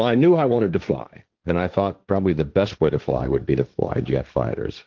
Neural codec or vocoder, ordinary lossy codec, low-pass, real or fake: codec, 16 kHz, 1.1 kbps, Voila-Tokenizer; Opus, 32 kbps; 7.2 kHz; fake